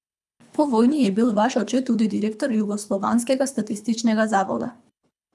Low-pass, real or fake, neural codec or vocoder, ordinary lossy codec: none; fake; codec, 24 kHz, 3 kbps, HILCodec; none